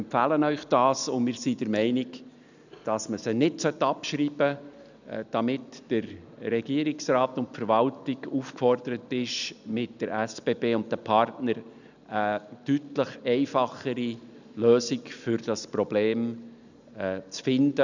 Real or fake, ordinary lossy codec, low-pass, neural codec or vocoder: real; none; 7.2 kHz; none